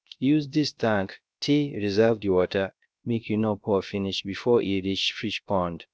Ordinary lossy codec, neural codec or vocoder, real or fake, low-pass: none; codec, 16 kHz, 0.3 kbps, FocalCodec; fake; none